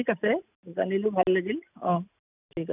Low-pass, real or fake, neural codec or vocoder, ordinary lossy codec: 3.6 kHz; real; none; none